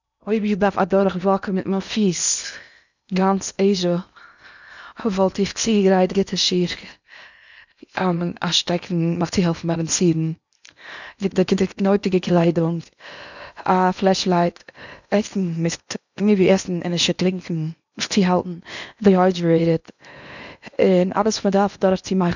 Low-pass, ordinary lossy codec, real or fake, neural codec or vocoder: 7.2 kHz; none; fake; codec, 16 kHz in and 24 kHz out, 0.8 kbps, FocalCodec, streaming, 65536 codes